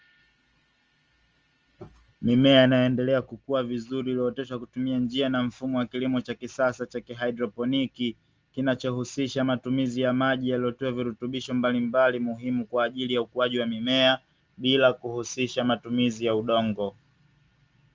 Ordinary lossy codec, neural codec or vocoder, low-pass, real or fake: Opus, 24 kbps; none; 7.2 kHz; real